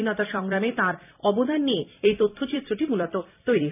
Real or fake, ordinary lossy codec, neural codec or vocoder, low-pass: fake; none; vocoder, 44.1 kHz, 128 mel bands every 256 samples, BigVGAN v2; 3.6 kHz